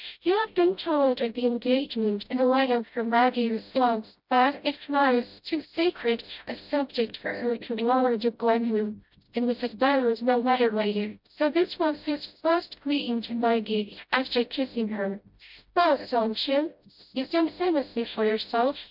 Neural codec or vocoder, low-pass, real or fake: codec, 16 kHz, 0.5 kbps, FreqCodec, smaller model; 5.4 kHz; fake